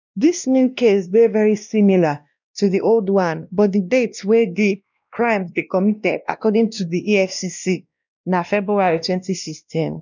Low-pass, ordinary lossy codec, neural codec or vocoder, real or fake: 7.2 kHz; none; codec, 16 kHz, 1 kbps, X-Codec, WavLM features, trained on Multilingual LibriSpeech; fake